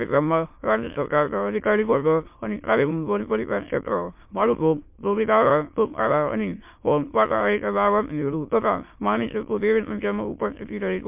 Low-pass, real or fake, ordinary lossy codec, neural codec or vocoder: 3.6 kHz; fake; MP3, 32 kbps; autoencoder, 22.05 kHz, a latent of 192 numbers a frame, VITS, trained on many speakers